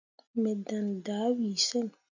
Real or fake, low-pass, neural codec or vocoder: real; 7.2 kHz; none